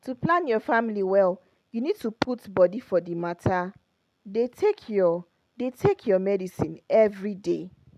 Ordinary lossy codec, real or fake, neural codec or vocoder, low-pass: MP3, 96 kbps; real; none; 14.4 kHz